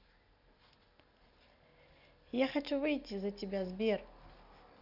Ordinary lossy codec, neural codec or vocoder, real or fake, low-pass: none; none; real; 5.4 kHz